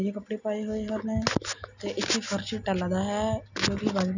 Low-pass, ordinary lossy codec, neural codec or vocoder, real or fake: 7.2 kHz; none; none; real